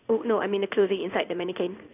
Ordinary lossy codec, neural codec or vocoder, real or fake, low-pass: none; codec, 16 kHz in and 24 kHz out, 1 kbps, XY-Tokenizer; fake; 3.6 kHz